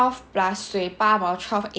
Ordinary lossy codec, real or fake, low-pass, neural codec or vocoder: none; real; none; none